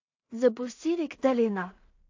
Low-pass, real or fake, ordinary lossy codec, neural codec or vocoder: 7.2 kHz; fake; AAC, 48 kbps; codec, 16 kHz in and 24 kHz out, 0.4 kbps, LongCat-Audio-Codec, two codebook decoder